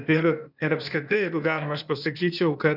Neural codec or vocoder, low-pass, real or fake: codec, 16 kHz, 0.8 kbps, ZipCodec; 5.4 kHz; fake